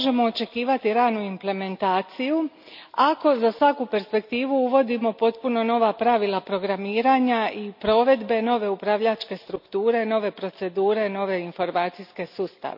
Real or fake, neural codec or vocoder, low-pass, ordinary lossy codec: real; none; 5.4 kHz; none